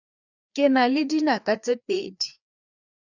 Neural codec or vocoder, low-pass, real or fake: codec, 16 kHz, 2 kbps, FreqCodec, larger model; 7.2 kHz; fake